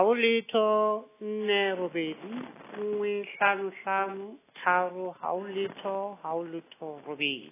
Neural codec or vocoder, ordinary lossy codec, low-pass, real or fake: none; AAC, 16 kbps; 3.6 kHz; real